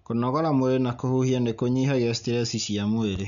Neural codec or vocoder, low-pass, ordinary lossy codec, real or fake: none; 7.2 kHz; none; real